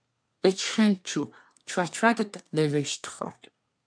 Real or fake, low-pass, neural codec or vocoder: fake; 9.9 kHz; codec, 24 kHz, 1 kbps, SNAC